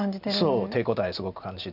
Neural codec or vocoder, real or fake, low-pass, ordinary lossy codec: none; real; 5.4 kHz; none